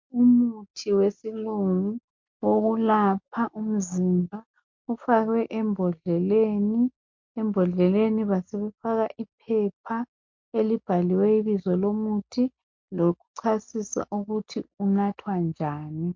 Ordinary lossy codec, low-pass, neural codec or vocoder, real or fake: MP3, 48 kbps; 7.2 kHz; none; real